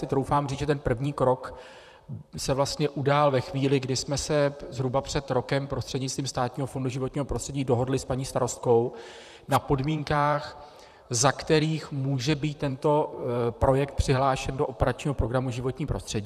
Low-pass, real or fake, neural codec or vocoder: 14.4 kHz; fake; vocoder, 44.1 kHz, 128 mel bands, Pupu-Vocoder